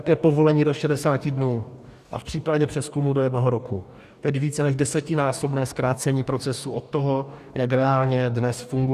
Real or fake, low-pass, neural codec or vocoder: fake; 14.4 kHz; codec, 44.1 kHz, 2.6 kbps, DAC